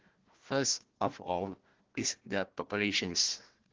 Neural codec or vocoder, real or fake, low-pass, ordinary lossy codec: codec, 16 kHz, 1 kbps, FunCodec, trained on Chinese and English, 50 frames a second; fake; 7.2 kHz; Opus, 16 kbps